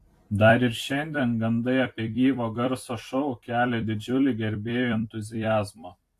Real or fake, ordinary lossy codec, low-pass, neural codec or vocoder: fake; AAC, 64 kbps; 14.4 kHz; vocoder, 44.1 kHz, 128 mel bands every 256 samples, BigVGAN v2